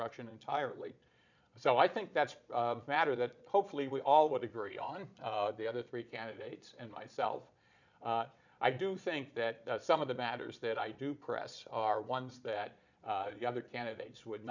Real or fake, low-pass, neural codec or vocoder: fake; 7.2 kHz; vocoder, 22.05 kHz, 80 mel bands, Vocos